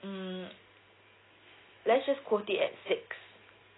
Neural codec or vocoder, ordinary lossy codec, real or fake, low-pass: none; AAC, 16 kbps; real; 7.2 kHz